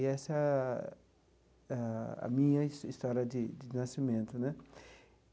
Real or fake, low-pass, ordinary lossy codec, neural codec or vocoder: real; none; none; none